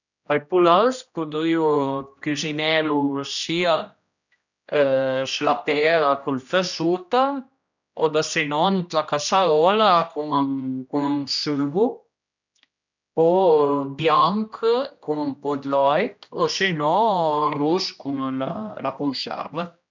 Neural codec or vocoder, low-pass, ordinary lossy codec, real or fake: codec, 16 kHz, 1 kbps, X-Codec, HuBERT features, trained on general audio; 7.2 kHz; none; fake